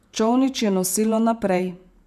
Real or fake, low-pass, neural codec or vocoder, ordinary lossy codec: fake; 14.4 kHz; vocoder, 48 kHz, 128 mel bands, Vocos; none